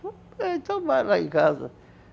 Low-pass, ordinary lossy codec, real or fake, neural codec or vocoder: none; none; real; none